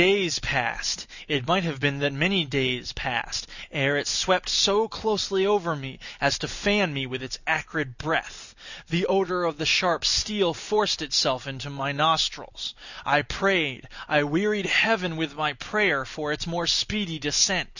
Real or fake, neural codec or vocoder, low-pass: real; none; 7.2 kHz